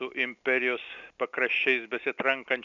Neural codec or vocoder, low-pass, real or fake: none; 7.2 kHz; real